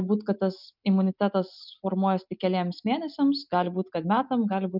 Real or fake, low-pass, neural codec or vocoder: real; 5.4 kHz; none